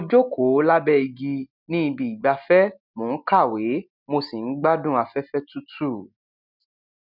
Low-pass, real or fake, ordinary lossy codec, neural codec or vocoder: 5.4 kHz; real; none; none